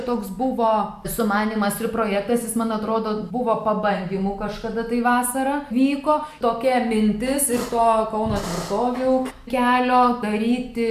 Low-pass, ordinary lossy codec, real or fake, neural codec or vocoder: 14.4 kHz; AAC, 96 kbps; real; none